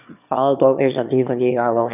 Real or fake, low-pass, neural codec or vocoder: fake; 3.6 kHz; autoencoder, 22.05 kHz, a latent of 192 numbers a frame, VITS, trained on one speaker